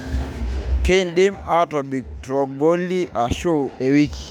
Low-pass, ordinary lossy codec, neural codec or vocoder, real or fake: 19.8 kHz; none; autoencoder, 48 kHz, 32 numbers a frame, DAC-VAE, trained on Japanese speech; fake